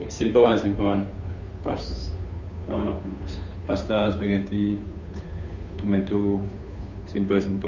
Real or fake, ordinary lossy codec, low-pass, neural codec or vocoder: fake; none; 7.2 kHz; codec, 16 kHz, 2 kbps, FunCodec, trained on Chinese and English, 25 frames a second